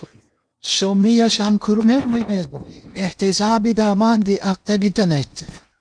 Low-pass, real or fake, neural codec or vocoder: 9.9 kHz; fake; codec, 16 kHz in and 24 kHz out, 0.8 kbps, FocalCodec, streaming, 65536 codes